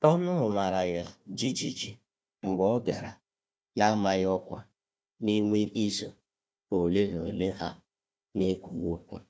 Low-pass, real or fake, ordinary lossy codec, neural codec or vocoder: none; fake; none; codec, 16 kHz, 1 kbps, FunCodec, trained on Chinese and English, 50 frames a second